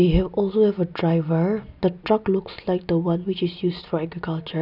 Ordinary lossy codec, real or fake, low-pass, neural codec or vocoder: none; real; 5.4 kHz; none